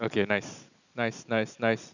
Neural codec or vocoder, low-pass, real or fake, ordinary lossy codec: none; 7.2 kHz; real; none